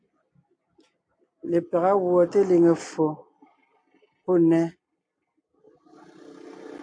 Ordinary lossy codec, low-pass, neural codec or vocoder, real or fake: AAC, 48 kbps; 9.9 kHz; none; real